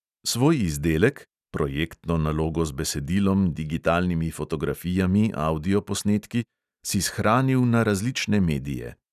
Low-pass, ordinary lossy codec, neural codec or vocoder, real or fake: 14.4 kHz; none; none; real